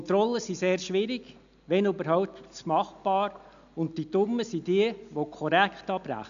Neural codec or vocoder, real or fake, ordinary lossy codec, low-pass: none; real; none; 7.2 kHz